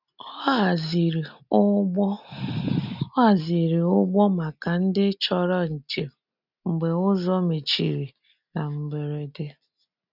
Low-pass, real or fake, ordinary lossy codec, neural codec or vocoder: 5.4 kHz; real; none; none